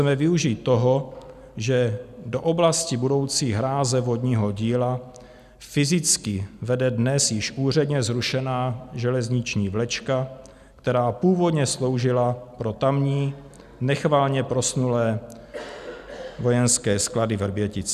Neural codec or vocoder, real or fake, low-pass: none; real; 14.4 kHz